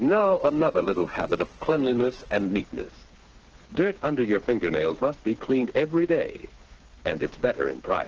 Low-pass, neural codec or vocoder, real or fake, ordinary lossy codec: 7.2 kHz; codec, 16 kHz, 4 kbps, FreqCodec, smaller model; fake; Opus, 16 kbps